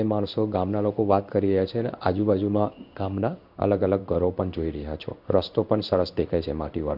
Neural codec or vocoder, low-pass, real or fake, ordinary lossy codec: codec, 16 kHz in and 24 kHz out, 1 kbps, XY-Tokenizer; 5.4 kHz; fake; none